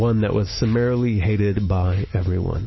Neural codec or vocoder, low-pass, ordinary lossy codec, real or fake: none; 7.2 kHz; MP3, 24 kbps; real